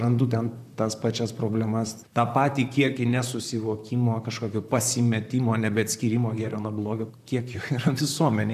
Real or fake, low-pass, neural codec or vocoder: fake; 14.4 kHz; vocoder, 44.1 kHz, 128 mel bands, Pupu-Vocoder